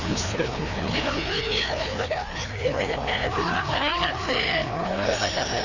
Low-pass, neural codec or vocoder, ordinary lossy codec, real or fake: 7.2 kHz; codec, 16 kHz, 2 kbps, FreqCodec, larger model; none; fake